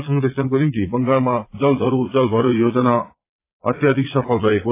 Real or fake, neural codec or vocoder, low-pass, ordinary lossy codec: fake; vocoder, 22.05 kHz, 80 mel bands, Vocos; 3.6 kHz; AAC, 24 kbps